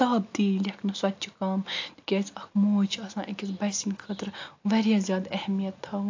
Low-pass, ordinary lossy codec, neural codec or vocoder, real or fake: 7.2 kHz; AAC, 48 kbps; none; real